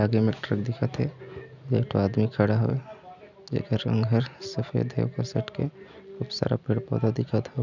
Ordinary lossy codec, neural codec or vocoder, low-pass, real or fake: none; none; 7.2 kHz; real